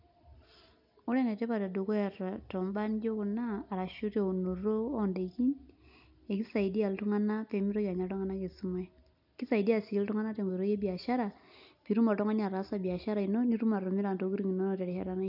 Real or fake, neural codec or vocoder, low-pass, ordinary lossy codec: real; none; 5.4 kHz; none